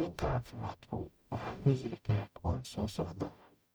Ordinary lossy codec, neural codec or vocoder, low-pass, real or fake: none; codec, 44.1 kHz, 0.9 kbps, DAC; none; fake